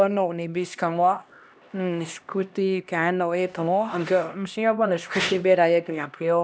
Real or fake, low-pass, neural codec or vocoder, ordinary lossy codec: fake; none; codec, 16 kHz, 1 kbps, X-Codec, HuBERT features, trained on LibriSpeech; none